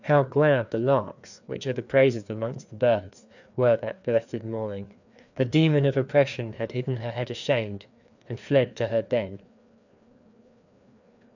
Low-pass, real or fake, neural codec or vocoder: 7.2 kHz; fake; codec, 16 kHz, 2 kbps, FreqCodec, larger model